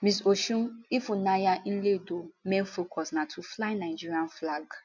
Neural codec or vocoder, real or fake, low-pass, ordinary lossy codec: none; real; 7.2 kHz; none